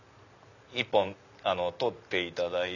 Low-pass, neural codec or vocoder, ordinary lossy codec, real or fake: 7.2 kHz; none; none; real